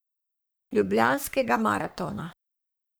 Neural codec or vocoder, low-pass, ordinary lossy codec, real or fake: codec, 44.1 kHz, 3.4 kbps, Pupu-Codec; none; none; fake